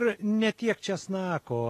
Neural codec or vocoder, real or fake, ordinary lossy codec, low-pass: none; real; AAC, 48 kbps; 14.4 kHz